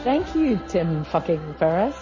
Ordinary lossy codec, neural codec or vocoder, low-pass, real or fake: MP3, 32 kbps; none; 7.2 kHz; real